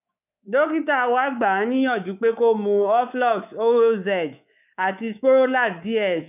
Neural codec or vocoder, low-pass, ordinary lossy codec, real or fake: codec, 24 kHz, 3.1 kbps, DualCodec; 3.6 kHz; none; fake